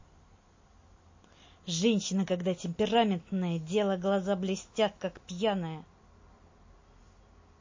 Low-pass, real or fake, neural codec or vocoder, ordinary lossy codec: 7.2 kHz; real; none; MP3, 32 kbps